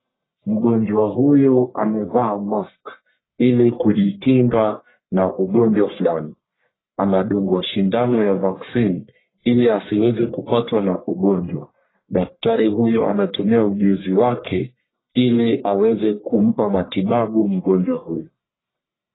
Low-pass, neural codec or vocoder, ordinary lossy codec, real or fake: 7.2 kHz; codec, 44.1 kHz, 1.7 kbps, Pupu-Codec; AAC, 16 kbps; fake